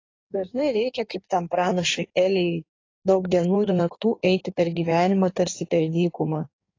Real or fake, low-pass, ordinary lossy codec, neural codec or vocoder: fake; 7.2 kHz; AAC, 32 kbps; codec, 16 kHz in and 24 kHz out, 1.1 kbps, FireRedTTS-2 codec